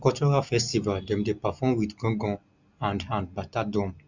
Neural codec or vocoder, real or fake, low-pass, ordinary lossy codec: none; real; 7.2 kHz; Opus, 64 kbps